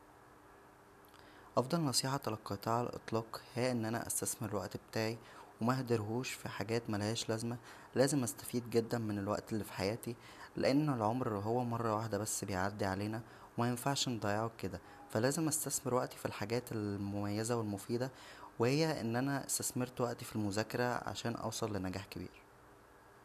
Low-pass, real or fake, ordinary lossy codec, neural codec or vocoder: 14.4 kHz; real; none; none